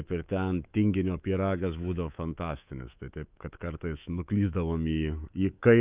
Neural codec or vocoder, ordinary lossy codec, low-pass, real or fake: codec, 24 kHz, 3.1 kbps, DualCodec; Opus, 24 kbps; 3.6 kHz; fake